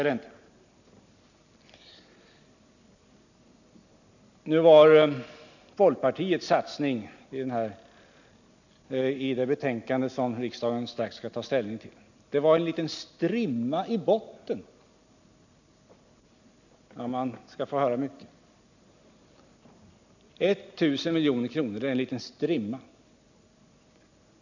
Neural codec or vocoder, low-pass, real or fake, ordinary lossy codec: none; 7.2 kHz; real; none